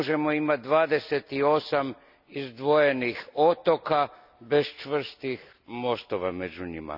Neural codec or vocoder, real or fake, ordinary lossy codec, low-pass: none; real; none; 5.4 kHz